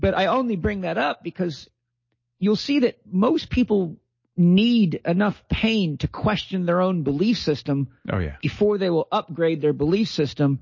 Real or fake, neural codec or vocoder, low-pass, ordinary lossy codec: real; none; 7.2 kHz; MP3, 32 kbps